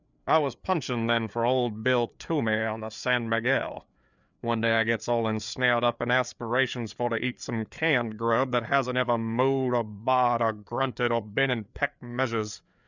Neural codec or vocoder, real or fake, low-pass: codec, 16 kHz, 4 kbps, FreqCodec, larger model; fake; 7.2 kHz